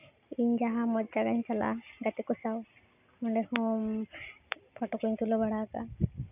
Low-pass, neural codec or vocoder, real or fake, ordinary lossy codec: 3.6 kHz; none; real; none